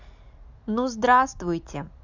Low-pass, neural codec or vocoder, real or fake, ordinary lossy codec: 7.2 kHz; none; real; none